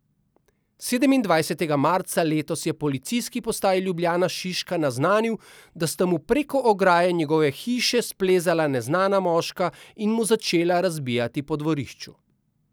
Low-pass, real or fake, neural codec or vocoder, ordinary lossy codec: none; real; none; none